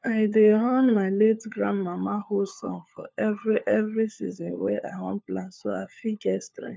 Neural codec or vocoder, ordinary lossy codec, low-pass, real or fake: codec, 16 kHz, 4 kbps, FunCodec, trained on LibriTTS, 50 frames a second; none; none; fake